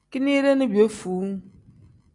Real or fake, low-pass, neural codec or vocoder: real; 10.8 kHz; none